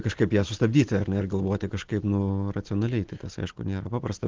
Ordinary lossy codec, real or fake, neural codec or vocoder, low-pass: Opus, 16 kbps; real; none; 7.2 kHz